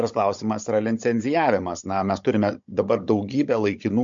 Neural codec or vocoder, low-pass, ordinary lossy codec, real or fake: codec, 16 kHz, 16 kbps, FunCodec, trained on Chinese and English, 50 frames a second; 7.2 kHz; MP3, 48 kbps; fake